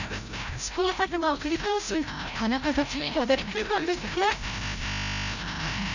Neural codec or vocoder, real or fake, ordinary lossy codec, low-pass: codec, 16 kHz, 0.5 kbps, FreqCodec, larger model; fake; none; 7.2 kHz